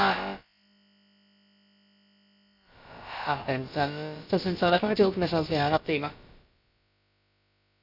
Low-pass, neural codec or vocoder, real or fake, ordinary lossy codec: 5.4 kHz; codec, 16 kHz, about 1 kbps, DyCAST, with the encoder's durations; fake; none